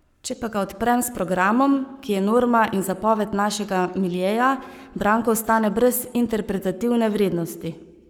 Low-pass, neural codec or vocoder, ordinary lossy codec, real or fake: 19.8 kHz; codec, 44.1 kHz, 7.8 kbps, Pupu-Codec; none; fake